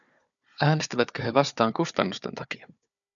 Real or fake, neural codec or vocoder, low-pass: fake; codec, 16 kHz, 4 kbps, FunCodec, trained on Chinese and English, 50 frames a second; 7.2 kHz